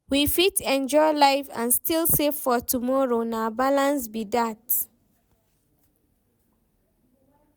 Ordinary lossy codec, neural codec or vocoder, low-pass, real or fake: none; none; none; real